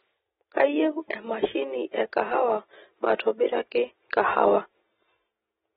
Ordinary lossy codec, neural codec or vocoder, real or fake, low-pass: AAC, 16 kbps; none; real; 19.8 kHz